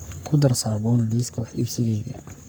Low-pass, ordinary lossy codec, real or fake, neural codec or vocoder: none; none; fake; codec, 44.1 kHz, 3.4 kbps, Pupu-Codec